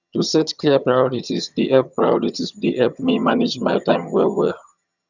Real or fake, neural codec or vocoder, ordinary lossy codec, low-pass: fake; vocoder, 22.05 kHz, 80 mel bands, HiFi-GAN; none; 7.2 kHz